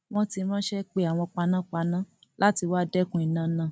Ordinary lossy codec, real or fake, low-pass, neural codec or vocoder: none; real; none; none